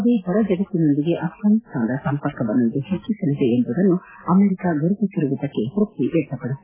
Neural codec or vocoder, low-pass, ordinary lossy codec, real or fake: none; 3.6 kHz; AAC, 16 kbps; real